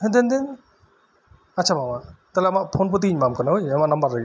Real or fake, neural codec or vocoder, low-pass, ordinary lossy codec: real; none; none; none